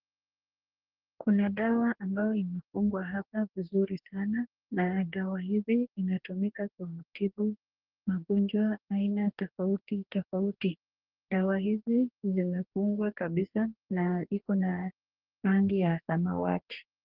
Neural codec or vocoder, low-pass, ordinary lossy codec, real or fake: codec, 44.1 kHz, 2.6 kbps, DAC; 5.4 kHz; Opus, 16 kbps; fake